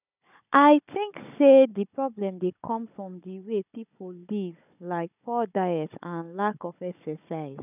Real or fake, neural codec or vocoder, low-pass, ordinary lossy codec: fake; codec, 16 kHz, 16 kbps, FunCodec, trained on Chinese and English, 50 frames a second; 3.6 kHz; none